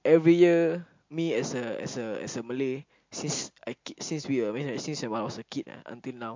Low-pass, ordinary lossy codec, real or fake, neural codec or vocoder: 7.2 kHz; MP3, 48 kbps; real; none